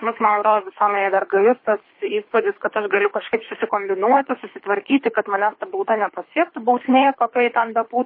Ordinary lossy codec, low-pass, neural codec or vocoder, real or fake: MP3, 24 kbps; 5.4 kHz; codec, 24 kHz, 3 kbps, HILCodec; fake